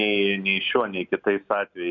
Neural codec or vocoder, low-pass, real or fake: none; 7.2 kHz; real